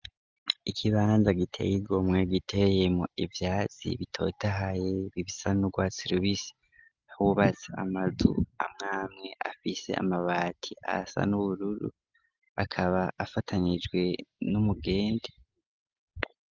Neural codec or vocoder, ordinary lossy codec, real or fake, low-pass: none; Opus, 32 kbps; real; 7.2 kHz